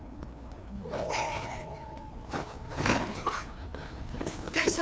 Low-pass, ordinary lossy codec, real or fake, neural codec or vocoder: none; none; fake; codec, 16 kHz, 2 kbps, FreqCodec, larger model